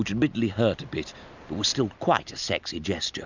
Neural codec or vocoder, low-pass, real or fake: none; 7.2 kHz; real